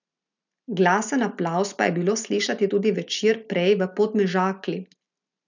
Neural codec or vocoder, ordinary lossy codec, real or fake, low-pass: none; none; real; 7.2 kHz